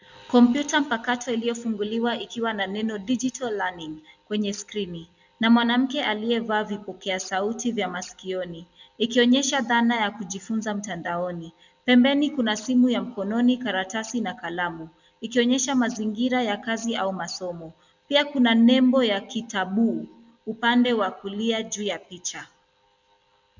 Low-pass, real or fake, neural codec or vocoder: 7.2 kHz; real; none